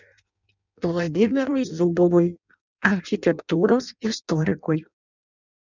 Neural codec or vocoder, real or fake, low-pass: codec, 16 kHz in and 24 kHz out, 0.6 kbps, FireRedTTS-2 codec; fake; 7.2 kHz